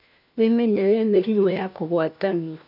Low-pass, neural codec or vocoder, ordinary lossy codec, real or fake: 5.4 kHz; codec, 16 kHz, 1 kbps, FunCodec, trained on LibriTTS, 50 frames a second; none; fake